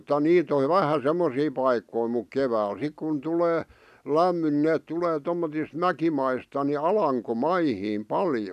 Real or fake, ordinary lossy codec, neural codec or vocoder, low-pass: real; none; none; 14.4 kHz